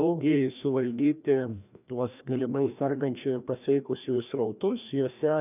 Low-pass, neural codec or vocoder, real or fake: 3.6 kHz; codec, 16 kHz, 1 kbps, FreqCodec, larger model; fake